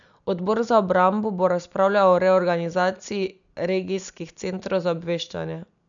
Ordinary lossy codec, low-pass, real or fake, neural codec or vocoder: none; 7.2 kHz; real; none